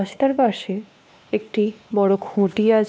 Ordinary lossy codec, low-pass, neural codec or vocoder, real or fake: none; none; codec, 16 kHz, 2 kbps, X-Codec, WavLM features, trained on Multilingual LibriSpeech; fake